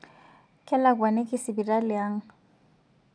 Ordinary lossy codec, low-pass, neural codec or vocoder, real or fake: none; 9.9 kHz; none; real